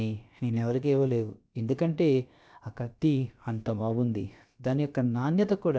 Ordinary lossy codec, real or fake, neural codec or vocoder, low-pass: none; fake; codec, 16 kHz, about 1 kbps, DyCAST, with the encoder's durations; none